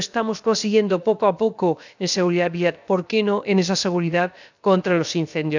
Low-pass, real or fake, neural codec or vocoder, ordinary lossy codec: 7.2 kHz; fake; codec, 16 kHz, about 1 kbps, DyCAST, with the encoder's durations; none